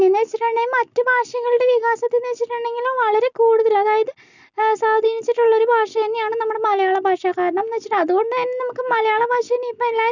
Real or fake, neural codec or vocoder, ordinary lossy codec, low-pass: real; none; none; 7.2 kHz